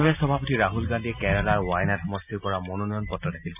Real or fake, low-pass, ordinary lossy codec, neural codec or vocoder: real; 3.6 kHz; none; none